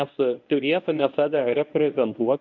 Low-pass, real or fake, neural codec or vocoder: 7.2 kHz; fake; codec, 24 kHz, 0.9 kbps, WavTokenizer, medium speech release version 1